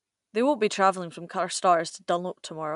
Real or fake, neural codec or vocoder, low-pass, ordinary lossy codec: real; none; 10.8 kHz; none